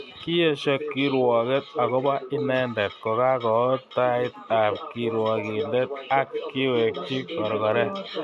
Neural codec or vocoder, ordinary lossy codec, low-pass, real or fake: none; none; none; real